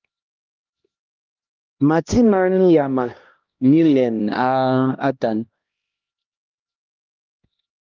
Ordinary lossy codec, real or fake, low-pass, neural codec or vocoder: Opus, 32 kbps; fake; 7.2 kHz; codec, 16 kHz, 1 kbps, X-Codec, HuBERT features, trained on LibriSpeech